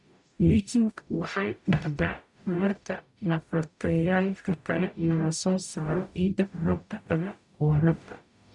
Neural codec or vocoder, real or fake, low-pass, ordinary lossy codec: codec, 44.1 kHz, 0.9 kbps, DAC; fake; 10.8 kHz; none